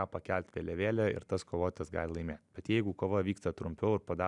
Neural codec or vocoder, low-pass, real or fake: none; 10.8 kHz; real